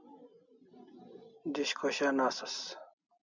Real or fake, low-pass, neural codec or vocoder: real; 7.2 kHz; none